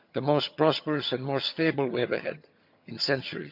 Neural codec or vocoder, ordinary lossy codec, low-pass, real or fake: vocoder, 22.05 kHz, 80 mel bands, HiFi-GAN; none; 5.4 kHz; fake